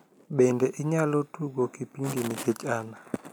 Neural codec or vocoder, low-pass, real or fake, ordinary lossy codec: none; none; real; none